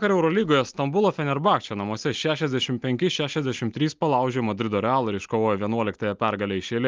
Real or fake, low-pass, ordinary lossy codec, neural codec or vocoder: real; 7.2 kHz; Opus, 32 kbps; none